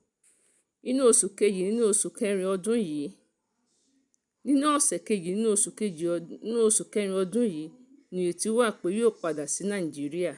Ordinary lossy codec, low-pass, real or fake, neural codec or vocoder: none; 10.8 kHz; real; none